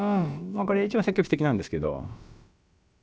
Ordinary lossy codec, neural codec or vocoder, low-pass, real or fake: none; codec, 16 kHz, about 1 kbps, DyCAST, with the encoder's durations; none; fake